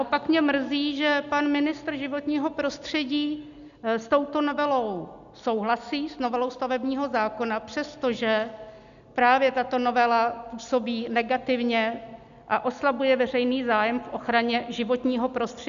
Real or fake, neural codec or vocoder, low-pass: real; none; 7.2 kHz